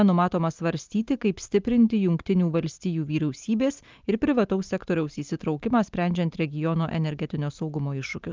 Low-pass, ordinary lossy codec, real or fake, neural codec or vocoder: 7.2 kHz; Opus, 32 kbps; real; none